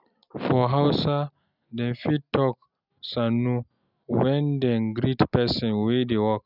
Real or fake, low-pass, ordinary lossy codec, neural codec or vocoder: real; 5.4 kHz; none; none